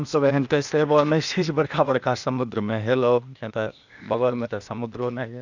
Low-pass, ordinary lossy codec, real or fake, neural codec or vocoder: 7.2 kHz; none; fake; codec, 16 kHz, 0.8 kbps, ZipCodec